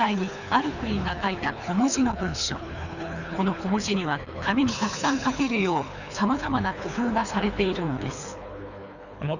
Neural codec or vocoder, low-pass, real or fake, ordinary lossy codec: codec, 24 kHz, 3 kbps, HILCodec; 7.2 kHz; fake; none